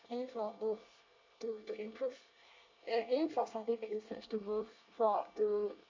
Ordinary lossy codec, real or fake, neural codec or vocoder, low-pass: MP3, 64 kbps; fake; codec, 24 kHz, 1 kbps, SNAC; 7.2 kHz